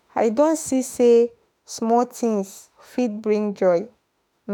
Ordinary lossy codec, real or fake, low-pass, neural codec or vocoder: none; fake; none; autoencoder, 48 kHz, 32 numbers a frame, DAC-VAE, trained on Japanese speech